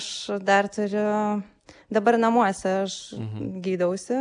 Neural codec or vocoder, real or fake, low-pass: none; real; 9.9 kHz